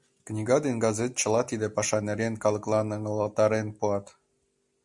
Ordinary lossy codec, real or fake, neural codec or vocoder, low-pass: Opus, 64 kbps; real; none; 10.8 kHz